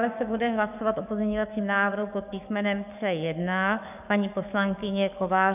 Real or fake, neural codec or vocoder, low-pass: fake; codec, 44.1 kHz, 7.8 kbps, DAC; 3.6 kHz